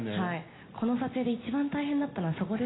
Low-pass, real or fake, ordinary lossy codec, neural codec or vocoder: 7.2 kHz; real; AAC, 16 kbps; none